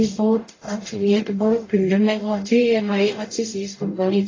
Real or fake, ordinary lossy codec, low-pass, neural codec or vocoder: fake; AAC, 32 kbps; 7.2 kHz; codec, 44.1 kHz, 0.9 kbps, DAC